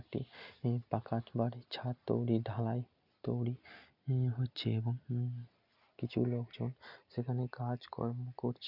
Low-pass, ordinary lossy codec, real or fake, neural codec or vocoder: 5.4 kHz; none; real; none